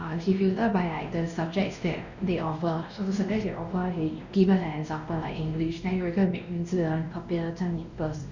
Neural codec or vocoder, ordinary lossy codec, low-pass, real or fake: codec, 16 kHz, 1 kbps, X-Codec, WavLM features, trained on Multilingual LibriSpeech; none; 7.2 kHz; fake